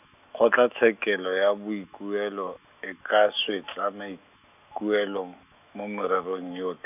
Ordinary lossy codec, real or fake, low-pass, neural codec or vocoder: none; fake; 3.6 kHz; autoencoder, 48 kHz, 128 numbers a frame, DAC-VAE, trained on Japanese speech